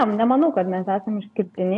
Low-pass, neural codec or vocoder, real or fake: 10.8 kHz; none; real